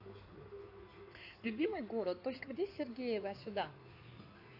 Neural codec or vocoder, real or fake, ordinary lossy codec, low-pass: codec, 16 kHz in and 24 kHz out, 2.2 kbps, FireRedTTS-2 codec; fake; AAC, 32 kbps; 5.4 kHz